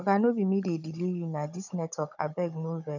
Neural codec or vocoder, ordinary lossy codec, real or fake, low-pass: codec, 16 kHz, 16 kbps, FunCodec, trained on Chinese and English, 50 frames a second; none; fake; 7.2 kHz